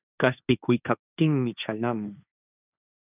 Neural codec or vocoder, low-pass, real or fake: codec, 16 kHz, 1.1 kbps, Voila-Tokenizer; 3.6 kHz; fake